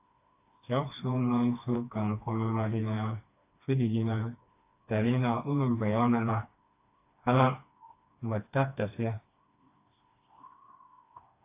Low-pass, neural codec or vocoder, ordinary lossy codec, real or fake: 3.6 kHz; codec, 16 kHz, 2 kbps, FreqCodec, smaller model; AAC, 24 kbps; fake